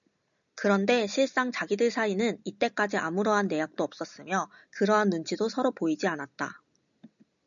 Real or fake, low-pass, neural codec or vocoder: real; 7.2 kHz; none